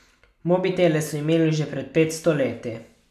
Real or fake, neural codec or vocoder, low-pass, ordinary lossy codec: real; none; 14.4 kHz; none